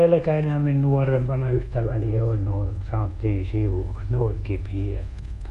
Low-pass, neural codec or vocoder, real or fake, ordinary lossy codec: 10.8 kHz; codec, 24 kHz, 1.2 kbps, DualCodec; fake; none